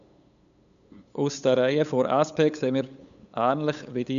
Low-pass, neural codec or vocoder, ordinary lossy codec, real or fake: 7.2 kHz; codec, 16 kHz, 8 kbps, FunCodec, trained on LibriTTS, 25 frames a second; none; fake